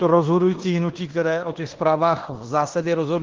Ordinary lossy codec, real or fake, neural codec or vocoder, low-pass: Opus, 16 kbps; fake; codec, 16 kHz in and 24 kHz out, 0.9 kbps, LongCat-Audio-Codec, fine tuned four codebook decoder; 7.2 kHz